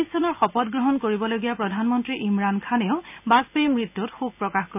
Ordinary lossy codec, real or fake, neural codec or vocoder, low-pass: none; real; none; 3.6 kHz